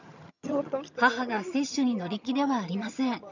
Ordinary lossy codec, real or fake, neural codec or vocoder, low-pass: none; fake; vocoder, 22.05 kHz, 80 mel bands, HiFi-GAN; 7.2 kHz